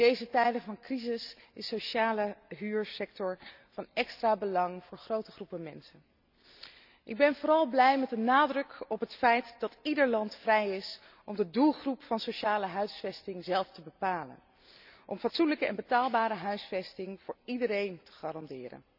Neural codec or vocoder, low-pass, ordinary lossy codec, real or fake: none; 5.4 kHz; none; real